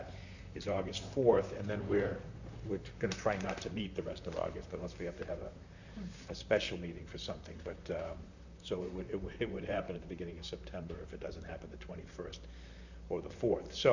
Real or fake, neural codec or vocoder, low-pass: fake; vocoder, 44.1 kHz, 128 mel bands, Pupu-Vocoder; 7.2 kHz